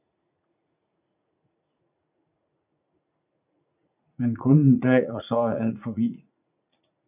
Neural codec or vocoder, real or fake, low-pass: vocoder, 44.1 kHz, 80 mel bands, Vocos; fake; 3.6 kHz